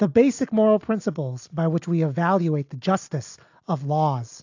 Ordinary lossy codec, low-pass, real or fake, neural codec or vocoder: AAC, 48 kbps; 7.2 kHz; real; none